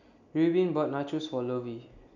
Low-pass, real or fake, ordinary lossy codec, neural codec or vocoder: 7.2 kHz; real; none; none